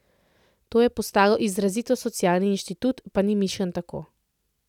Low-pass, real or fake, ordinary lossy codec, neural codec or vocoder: 19.8 kHz; real; none; none